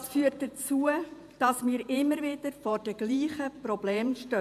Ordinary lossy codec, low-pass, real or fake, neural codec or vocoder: AAC, 96 kbps; 14.4 kHz; fake; vocoder, 48 kHz, 128 mel bands, Vocos